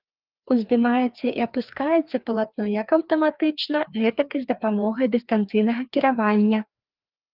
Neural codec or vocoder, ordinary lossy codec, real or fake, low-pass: codec, 16 kHz, 2 kbps, FreqCodec, larger model; Opus, 32 kbps; fake; 5.4 kHz